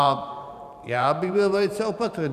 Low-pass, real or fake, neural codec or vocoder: 14.4 kHz; fake; vocoder, 44.1 kHz, 128 mel bands every 512 samples, BigVGAN v2